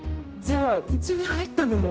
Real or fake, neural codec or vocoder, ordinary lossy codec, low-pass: fake; codec, 16 kHz, 0.5 kbps, X-Codec, HuBERT features, trained on general audio; none; none